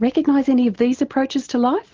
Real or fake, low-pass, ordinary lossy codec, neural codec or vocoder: real; 7.2 kHz; Opus, 16 kbps; none